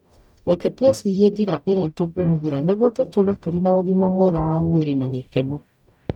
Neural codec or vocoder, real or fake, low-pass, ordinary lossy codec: codec, 44.1 kHz, 0.9 kbps, DAC; fake; 19.8 kHz; none